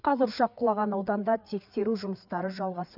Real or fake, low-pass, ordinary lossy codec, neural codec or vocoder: fake; 5.4 kHz; none; codec, 16 kHz, 4 kbps, FreqCodec, larger model